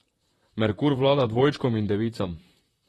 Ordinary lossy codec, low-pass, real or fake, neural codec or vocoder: AAC, 32 kbps; 19.8 kHz; fake; vocoder, 44.1 kHz, 128 mel bands, Pupu-Vocoder